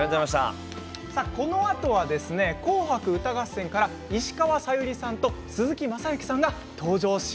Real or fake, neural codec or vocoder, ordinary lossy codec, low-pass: real; none; none; none